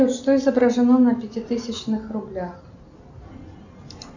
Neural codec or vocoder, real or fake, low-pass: none; real; 7.2 kHz